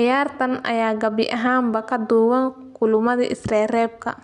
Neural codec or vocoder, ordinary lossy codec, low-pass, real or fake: none; none; 10.8 kHz; real